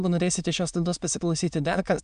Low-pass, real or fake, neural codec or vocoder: 9.9 kHz; fake; autoencoder, 22.05 kHz, a latent of 192 numbers a frame, VITS, trained on many speakers